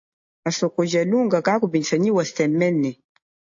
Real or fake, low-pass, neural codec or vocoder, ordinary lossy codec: real; 7.2 kHz; none; AAC, 48 kbps